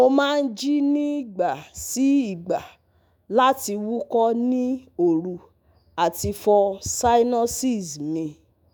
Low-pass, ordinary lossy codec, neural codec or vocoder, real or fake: none; none; autoencoder, 48 kHz, 128 numbers a frame, DAC-VAE, trained on Japanese speech; fake